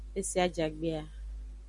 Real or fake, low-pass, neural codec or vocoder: real; 10.8 kHz; none